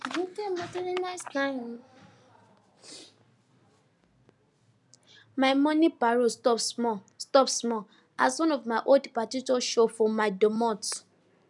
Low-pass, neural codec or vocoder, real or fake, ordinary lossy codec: 10.8 kHz; none; real; none